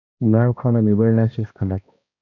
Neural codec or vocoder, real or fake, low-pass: codec, 16 kHz, 1 kbps, X-Codec, HuBERT features, trained on balanced general audio; fake; 7.2 kHz